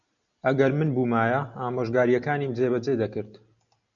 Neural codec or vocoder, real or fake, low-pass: none; real; 7.2 kHz